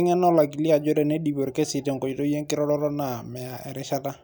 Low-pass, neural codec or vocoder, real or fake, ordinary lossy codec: none; none; real; none